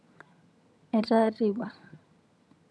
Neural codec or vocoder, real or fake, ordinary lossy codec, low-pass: vocoder, 22.05 kHz, 80 mel bands, HiFi-GAN; fake; none; none